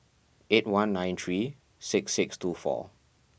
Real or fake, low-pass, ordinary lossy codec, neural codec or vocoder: real; none; none; none